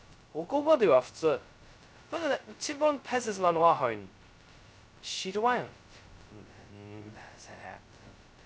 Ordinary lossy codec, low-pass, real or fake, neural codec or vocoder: none; none; fake; codec, 16 kHz, 0.2 kbps, FocalCodec